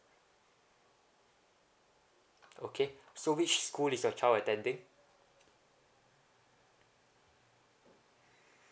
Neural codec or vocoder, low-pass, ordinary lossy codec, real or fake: none; none; none; real